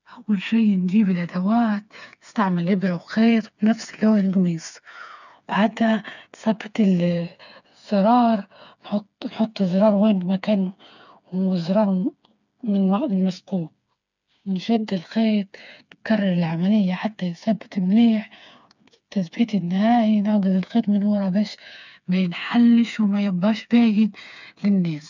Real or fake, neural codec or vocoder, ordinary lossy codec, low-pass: fake; codec, 16 kHz, 4 kbps, FreqCodec, smaller model; none; 7.2 kHz